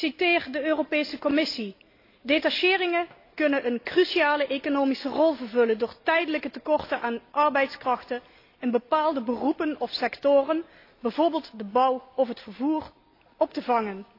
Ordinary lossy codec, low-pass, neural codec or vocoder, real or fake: AAC, 32 kbps; 5.4 kHz; none; real